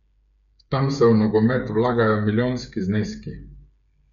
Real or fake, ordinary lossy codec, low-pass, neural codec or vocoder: fake; none; 7.2 kHz; codec, 16 kHz, 8 kbps, FreqCodec, smaller model